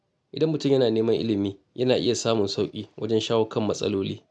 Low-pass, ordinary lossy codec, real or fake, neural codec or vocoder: none; none; real; none